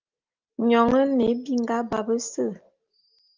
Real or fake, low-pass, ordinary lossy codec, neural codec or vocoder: real; 7.2 kHz; Opus, 32 kbps; none